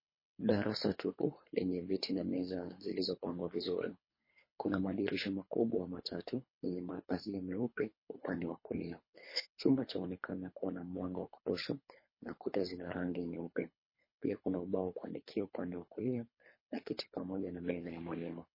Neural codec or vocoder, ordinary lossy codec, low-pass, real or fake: codec, 24 kHz, 3 kbps, HILCodec; MP3, 24 kbps; 5.4 kHz; fake